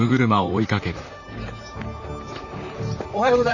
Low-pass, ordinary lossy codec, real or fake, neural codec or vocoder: 7.2 kHz; none; fake; vocoder, 44.1 kHz, 128 mel bands, Pupu-Vocoder